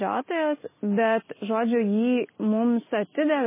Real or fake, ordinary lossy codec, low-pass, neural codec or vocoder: real; MP3, 16 kbps; 3.6 kHz; none